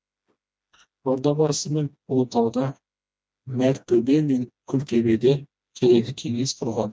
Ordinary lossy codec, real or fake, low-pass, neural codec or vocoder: none; fake; none; codec, 16 kHz, 1 kbps, FreqCodec, smaller model